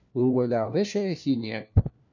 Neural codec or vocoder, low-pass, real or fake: codec, 16 kHz, 1 kbps, FunCodec, trained on LibriTTS, 50 frames a second; 7.2 kHz; fake